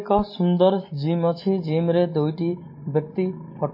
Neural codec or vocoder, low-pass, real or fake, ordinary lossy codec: none; 5.4 kHz; real; MP3, 24 kbps